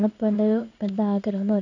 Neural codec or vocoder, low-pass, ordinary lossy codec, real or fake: codec, 16 kHz in and 24 kHz out, 1 kbps, XY-Tokenizer; 7.2 kHz; none; fake